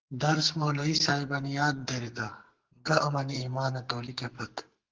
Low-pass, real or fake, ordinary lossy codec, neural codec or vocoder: 7.2 kHz; fake; Opus, 16 kbps; codec, 44.1 kHz, 2.6 kbps, SNAC